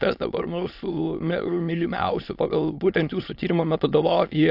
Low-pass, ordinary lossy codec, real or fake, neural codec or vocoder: 5.4 kHz; AAC, 48 kbps; fake; autoencoder, 22.05 kHz, a latent of 192 numbers a frame, VITS, trained on many speakers